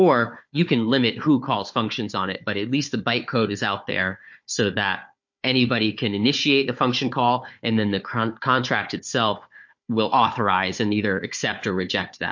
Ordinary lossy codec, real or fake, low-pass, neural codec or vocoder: MP3, 48 kbps; fake; 7.2 kHz; codec, 16 kHz, 4 kbps, FunCodec, trained on Chinese and English, 50 frames a second